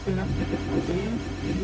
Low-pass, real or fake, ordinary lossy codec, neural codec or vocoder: none; fake; none; codec, 16 kHz, 0.4 kbps, LongCat-Audio-Codec